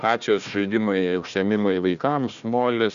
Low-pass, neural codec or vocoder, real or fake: 7.2 kHz; codec, 16 kHz, 1 kbps, FunCodec, trained on Chinese and English, 50 frames a second; fake